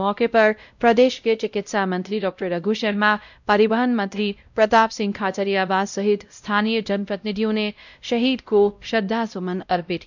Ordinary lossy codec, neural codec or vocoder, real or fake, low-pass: none; codec, 16 kHz, 0.5 kbps, X-Codec, WavLM features, trained on Multilingual LibriSpeech; fake; 7.2 kHz